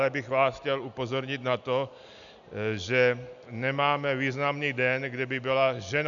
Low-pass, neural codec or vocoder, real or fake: 7.2 kHz; none; real